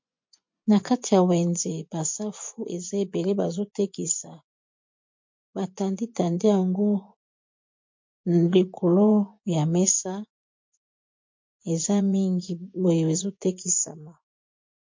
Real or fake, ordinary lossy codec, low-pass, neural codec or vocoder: fake; MP3, 48 kbps; 7.2 kHz; vocoder, 24 kHz, 100 mel bands, Vocos